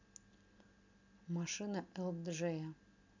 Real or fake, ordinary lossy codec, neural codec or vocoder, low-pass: real; AAC, 48 kbps; none; 7.2 kHz